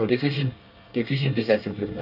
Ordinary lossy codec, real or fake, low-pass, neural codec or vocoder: none; fake; 5.4 kHz; codec, 24 kHz, 1 kbps, SNAC